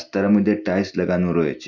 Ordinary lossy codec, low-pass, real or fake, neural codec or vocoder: none; 7.2 kHz; real; none